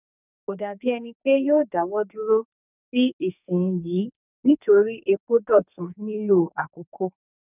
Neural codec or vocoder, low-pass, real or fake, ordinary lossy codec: codec, 44.1 kHz, 2.6 kbps, SNAC; 3.6 kHz; fake; none